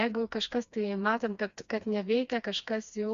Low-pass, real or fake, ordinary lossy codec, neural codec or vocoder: 7.2 kHz; fake; Opus, 64 kbps; codec, 16 kHz, 2 kbps, FreqCodec, smaller model